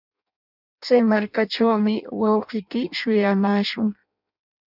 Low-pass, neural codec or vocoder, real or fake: 5.4 kHz; codec, 16 kHz in and 24 kHz out, 0.6 kbps, FireRedTTS-2 codec; fake